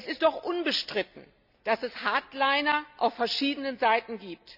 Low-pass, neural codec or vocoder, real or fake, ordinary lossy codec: 5.4 kHz; none; real; none